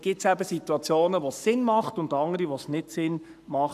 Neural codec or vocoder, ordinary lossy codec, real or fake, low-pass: codec, 44.1 kHz, 7.8 kbps, Pupu-Codec; none; fake; 14.4 kHz